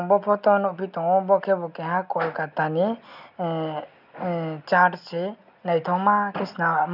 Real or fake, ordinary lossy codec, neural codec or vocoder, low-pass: real; none; none; 5.4 kHz